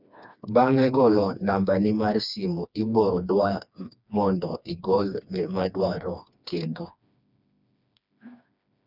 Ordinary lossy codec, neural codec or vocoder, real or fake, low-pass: none; codec, 16 kHz, 2 kbps, FreqCodec, smaller model; fake; 5.4 kHz